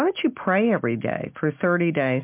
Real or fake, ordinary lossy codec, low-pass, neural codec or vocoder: real; MP3, 32 kbps; 3.6 kHz; none